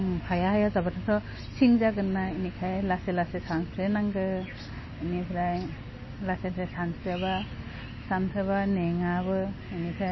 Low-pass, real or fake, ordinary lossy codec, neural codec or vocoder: 7.2 kHz; real; MP3, 24 kbps; none